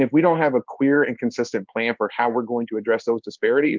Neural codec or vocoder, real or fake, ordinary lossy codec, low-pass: codec, 16 kHz in and 24 kHz out, 1 kbps, XY-Tokenizer; fake; Opus, 24 kbps; 7.2 kHz